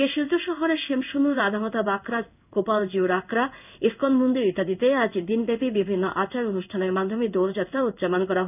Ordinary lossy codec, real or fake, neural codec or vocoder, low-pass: none; fake; codec, 16 kHz in and 24 kHz out, 1 kbps, XY-Tokenizer; 3.6 kHz